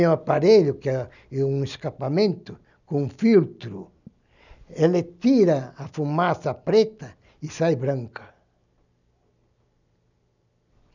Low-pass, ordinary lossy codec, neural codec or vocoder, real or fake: 7.2 kHz; none; none; real